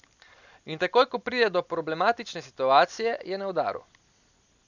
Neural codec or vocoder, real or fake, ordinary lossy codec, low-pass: none; real; none; 7.2 kHz